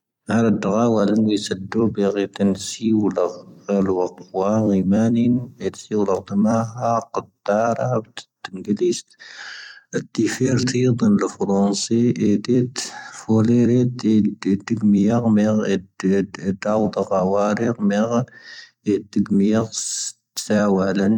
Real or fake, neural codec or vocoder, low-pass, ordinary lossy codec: fake; vocoder, 44.1 kHz, 128 mel bands every 256 samples, BigVGAN v2; 19.8 kHz; none